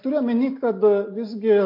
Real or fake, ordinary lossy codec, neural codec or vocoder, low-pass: real; MP3, 32 kbps; none; 5.4 kHz